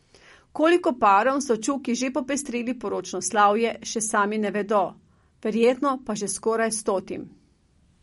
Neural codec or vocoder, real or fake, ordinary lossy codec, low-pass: none; real; MP3, 48 kbps; 19.8 kHz